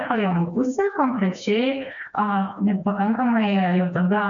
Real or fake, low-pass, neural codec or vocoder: fake; 7.2 kHz; codec, 16 kHz, 2 kbps, FreqCodec, smaller model